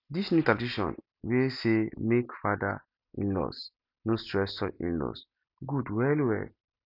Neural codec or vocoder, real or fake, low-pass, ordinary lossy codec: none; real; 5.4 kHz; none